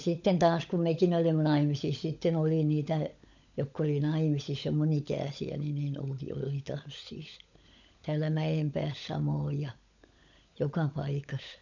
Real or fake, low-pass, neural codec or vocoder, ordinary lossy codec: fake; 7.2 kHz; codec, 16 kHz, 16 kbps, FunCodec, trained on LibriTTS, 50 frames a second; none